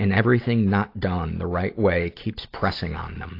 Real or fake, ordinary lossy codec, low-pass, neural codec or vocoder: real; AAC, 32 kbps; 5.4 kHz; none